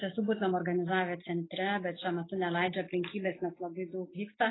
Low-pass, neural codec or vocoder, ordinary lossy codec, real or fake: 7.2 kHz; none; AAC, 16 kbps; real